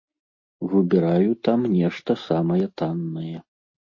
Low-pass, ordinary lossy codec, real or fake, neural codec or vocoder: 7.2 kHz; MP3, 32 kbps; real; none